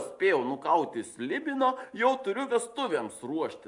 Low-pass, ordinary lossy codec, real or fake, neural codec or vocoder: 10.8 kHz; AAC, 64 kbps; real; none